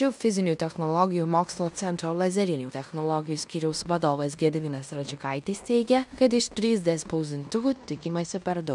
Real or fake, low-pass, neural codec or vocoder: fake; 10.8 kHz; codec, 16 kHz in and 24 kHz out, 0.9 kbps, LongCat-Audio-Codec, fine tuned four codebook decoder